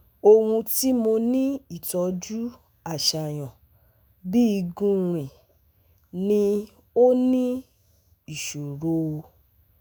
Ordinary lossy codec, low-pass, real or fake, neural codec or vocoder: none; none; fake; autoencoder, 48 kHz, 128 numbers a frame, DAC-VAE, trained on Japanese speech